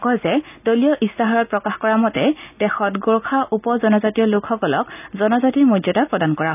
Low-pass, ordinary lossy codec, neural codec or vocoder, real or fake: 3.6 kHz; none; none; real